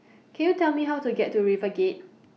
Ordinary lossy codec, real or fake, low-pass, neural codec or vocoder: none; real; none; none